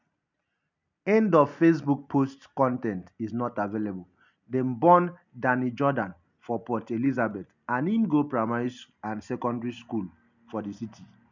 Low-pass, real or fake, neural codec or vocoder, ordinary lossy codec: 7.2 kHz; real; none; none